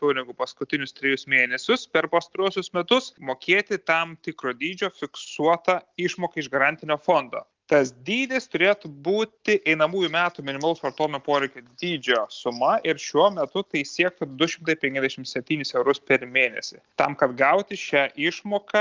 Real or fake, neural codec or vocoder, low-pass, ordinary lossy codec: real; none; 7.2 kHz; Opus, 24 kbps